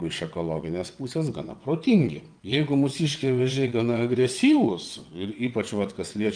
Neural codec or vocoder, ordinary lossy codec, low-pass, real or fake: vocoder, 22.05 kHz, 80 mel bands, WaveNeXt; Opus, 32 kbps; 9.9 kHz; fake